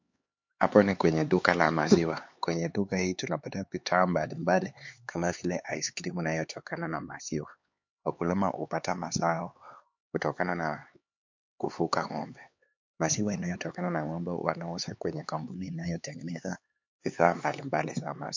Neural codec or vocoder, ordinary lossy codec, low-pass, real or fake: codec, 16 kHz, 4 kbps, X-Codec, HuBERT features, trained on LibriSpeech; MP3, 48 kbps; 7.2 kHz; fake